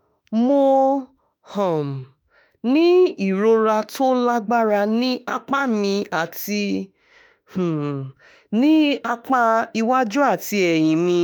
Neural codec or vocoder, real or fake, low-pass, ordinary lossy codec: autoencoder, 48 kHz, 32 numbers a frame, DAC-VAE, trained on Japanese speech; fake; none; none